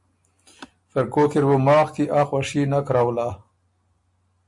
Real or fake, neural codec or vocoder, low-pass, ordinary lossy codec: real; none; 10.8 kHz; MP3, 48 kbps